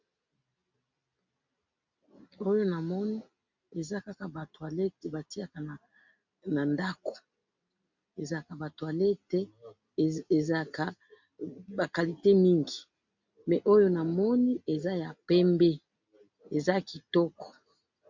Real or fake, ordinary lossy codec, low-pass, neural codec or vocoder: real; MP3, 64 kbps; 7.2 kHz; none